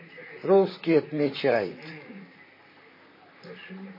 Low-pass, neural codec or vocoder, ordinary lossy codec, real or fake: 5.4 kHz; vocoder, 44.1 kHz, 128 mel bands, Pupu-Vocoder; MP3, 24 kbps; fake